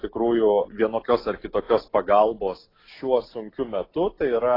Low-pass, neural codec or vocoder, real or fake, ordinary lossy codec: 5.4 kHz; none; real; AAC, 24 kbps